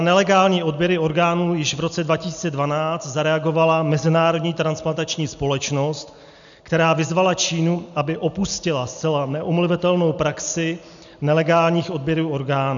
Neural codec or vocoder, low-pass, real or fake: none; 7.2 kHz; real